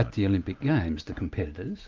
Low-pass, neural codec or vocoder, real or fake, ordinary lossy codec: 7.2 kHz; none; real; Opus, 24 kbps